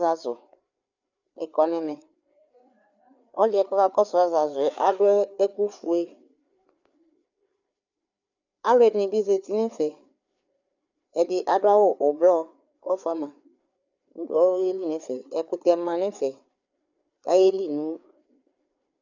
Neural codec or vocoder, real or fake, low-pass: codec, 16 kHz, 4 kbps, FreqCodec, larger model; fake; 7.2 kHz